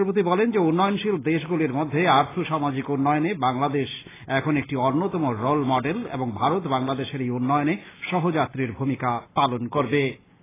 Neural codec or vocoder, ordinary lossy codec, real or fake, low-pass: none; AAC, 16 kbps; real; 3.6 kHz